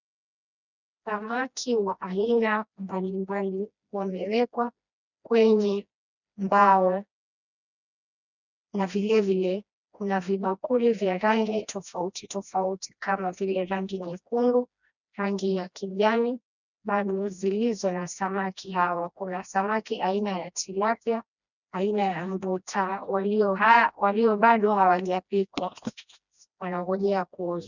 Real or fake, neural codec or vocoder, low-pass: fake; codec, 16 kHz, 1 kbps, FreqCodec, smaller model; 7.2 kHz